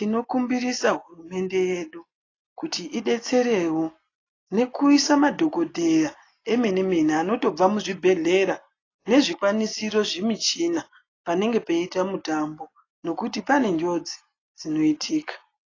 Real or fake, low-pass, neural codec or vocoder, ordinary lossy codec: real; 7.2 kHz; none; AAC, 32 kbps